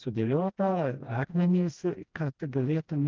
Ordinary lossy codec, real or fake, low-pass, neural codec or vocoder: Opus, 32 kbps; fake; 7.2 kHz; codec, 16 kHz, 1 kbps, FreqCodec, smaller model